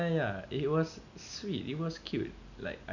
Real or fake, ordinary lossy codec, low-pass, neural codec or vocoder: real; none; 7.2 kHz; none